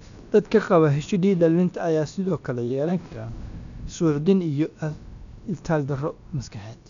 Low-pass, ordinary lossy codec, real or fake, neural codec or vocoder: 7.2 kHz; MP3, 96 kbps; fake; codec, 16 kHz, about 1 kbps, DyCAST, with the encoder's durations